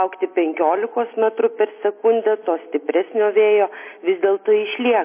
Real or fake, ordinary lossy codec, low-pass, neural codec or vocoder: real; MP3, 24 kbps; 3.6 kHz; none